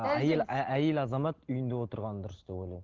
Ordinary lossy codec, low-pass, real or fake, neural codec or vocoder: Opus, 16 kbps; 7.2 kHz; real; none